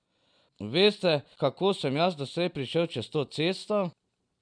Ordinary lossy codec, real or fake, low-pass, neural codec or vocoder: none; real; 9.9 kHz; none